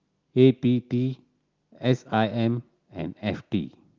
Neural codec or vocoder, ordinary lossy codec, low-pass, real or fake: none; Opus, 24 kbps; 7.2 kHz; real